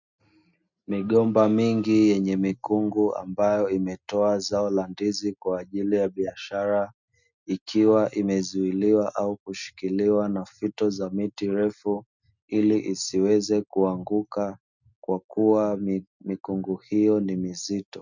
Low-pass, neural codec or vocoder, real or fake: 7.2 kHz; none; real